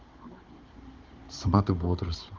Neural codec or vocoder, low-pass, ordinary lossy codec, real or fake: codec, 16 kHz, 16 kbps, FunCodec, trained on Chinese and English, 50 frames a second; 7.2 kHz; Opus, 16 kbps; fake